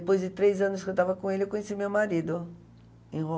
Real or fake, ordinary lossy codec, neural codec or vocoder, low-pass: real; none; none; none